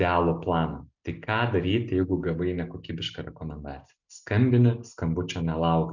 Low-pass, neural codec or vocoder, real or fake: 7.2 kHz; none; real